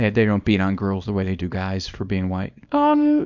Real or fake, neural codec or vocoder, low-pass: fake; codec, 24 kHz, 0.9 kbps, WavTokenizer, small release; 7.2 kHz